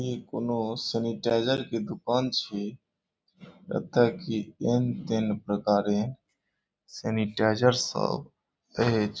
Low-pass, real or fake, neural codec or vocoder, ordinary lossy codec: none; real; none; none